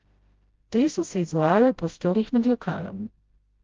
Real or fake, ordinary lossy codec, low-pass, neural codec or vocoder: fake; Opus, 24 kbps; 7.2 kHz; codec, 16 kHz, 0.5 kbps, FreqCodec, smaller model